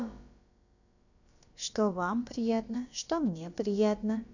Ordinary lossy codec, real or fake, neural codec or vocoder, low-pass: none; fake; codec, 16 kHz, about 1 kbps, DyCAST, with the encoder's durations; 7.2 kHz